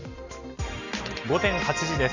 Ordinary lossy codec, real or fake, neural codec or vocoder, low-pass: Opus, 64 kbps; real; none; 7.2 kHz